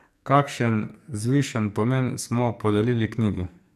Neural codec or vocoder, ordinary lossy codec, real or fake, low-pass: codec, 44.1 kHz, 2.6 kbps, SNAC; none; fake; 14.4 kHz